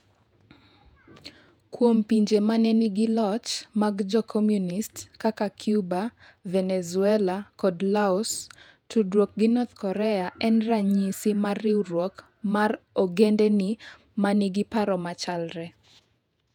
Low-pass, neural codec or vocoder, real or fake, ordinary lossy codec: 19.8 kHz; vocoder, 48 kHz, 128 mel bands, Vocos; fake; none